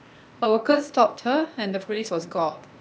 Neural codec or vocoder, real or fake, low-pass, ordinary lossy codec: codec, 16 kHz, 0.8 kbps, ZipCodec; fake; none; none